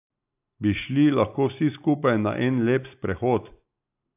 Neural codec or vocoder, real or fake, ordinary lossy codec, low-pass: none; real; AAC, 32 kbps; 3.6 kHz